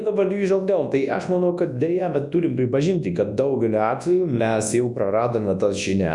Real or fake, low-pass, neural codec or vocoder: fake; 10.8 kHz; codec, 24 kHz, 0.9 kbps, WavTokenizer, large speech release